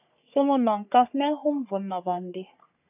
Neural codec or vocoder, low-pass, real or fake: codec, 44.1 kHz, 3.4 kbps, Pupu-Codec; 3.6 kHz; fake